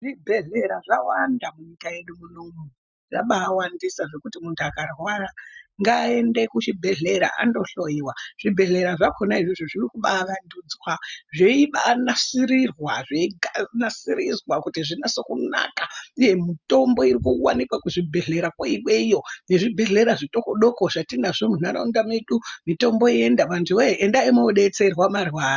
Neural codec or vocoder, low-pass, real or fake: none; 7.2 kHz; real